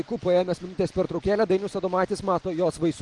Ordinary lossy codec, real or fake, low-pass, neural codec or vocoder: Opus, 24 kbps; real; 10.8 kHz; none